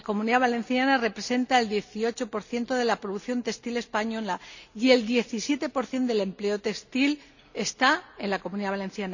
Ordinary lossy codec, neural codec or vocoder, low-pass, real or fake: none; none; 7.2 kHz; real